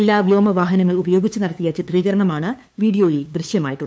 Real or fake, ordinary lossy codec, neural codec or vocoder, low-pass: fake; none; codec, 16 kHz, 2 kbps, FunCodec, trained on LibriTTS, 25 frames a second; none